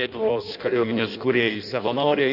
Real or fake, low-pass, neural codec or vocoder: fake; 5.4 kHz; codec, 16 kHz in and 24 kHz out, 0.6 kbps, FireRedTTS-2 codec